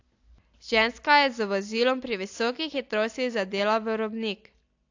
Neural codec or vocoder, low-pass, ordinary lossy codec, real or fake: none; 7.2 kHz; AAC, 48 kbps; real